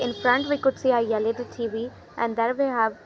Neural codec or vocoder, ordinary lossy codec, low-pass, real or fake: none; none; none; real